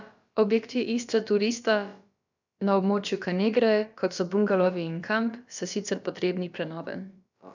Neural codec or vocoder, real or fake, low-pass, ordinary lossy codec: codec, 16 kHz, about 1 kbps, DyCAST, with the encoder's durations; fake; 7.2 kHz; none